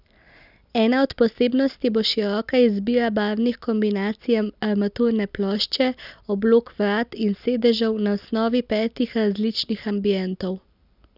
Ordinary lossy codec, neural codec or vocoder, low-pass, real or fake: none; none; 5.4 kHz; real